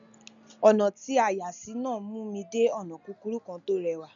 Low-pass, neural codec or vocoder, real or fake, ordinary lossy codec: 7.2 kHz; none; real; none